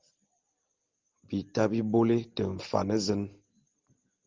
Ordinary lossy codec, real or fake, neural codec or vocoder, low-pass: Opus, 32 kbps; real; none; 7.2 kHz